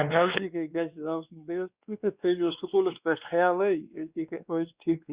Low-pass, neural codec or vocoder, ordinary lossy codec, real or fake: 3.6 kHz; codec, 16 kHz, 2 kbps, X-Codec, WavLM features, trained on Multilingual LibriSpeech; Opus, 64 kbps; fake